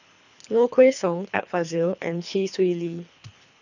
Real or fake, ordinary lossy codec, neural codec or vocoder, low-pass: fake; none; codec, 24 kHz, 3 kbps, HILCodec; 7.2 kHz